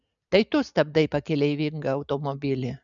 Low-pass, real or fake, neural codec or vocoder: 7.2 kHz; real; none